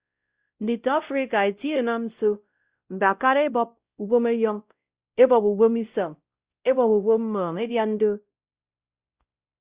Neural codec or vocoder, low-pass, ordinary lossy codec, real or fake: codec, 16 kHz, 0.5 kbps, X-Codec, WavLM features, trained on Multilingual LibriSpeech; 3.6 kHz; Opus, 64 kbps; fake